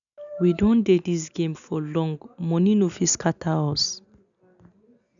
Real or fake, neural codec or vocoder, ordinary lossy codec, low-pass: real; none; none; 7.2 kHz